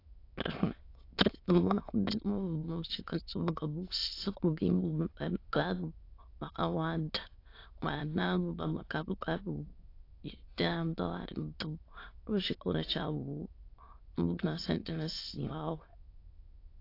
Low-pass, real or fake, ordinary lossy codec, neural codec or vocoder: 5.4 kHz; fake; AAC, 32 kbps; autoencoder, 22.05 kHz, a latent of 192 numbers a frame, VITS, trained on many speakers